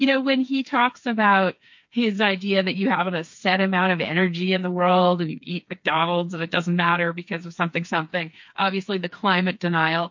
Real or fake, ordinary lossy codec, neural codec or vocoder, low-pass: fake; MP3, 48 kbps; codec, 16 kHz, 4 kbps, FreqCodec, smaller model; 7.2 kHz